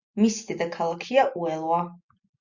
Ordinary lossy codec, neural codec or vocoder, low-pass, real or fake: Opus, 64 kbps; none; 7.2 kHz; real